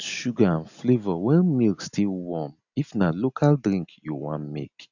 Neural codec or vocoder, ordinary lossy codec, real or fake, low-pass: none; none; real; 7.2 kHz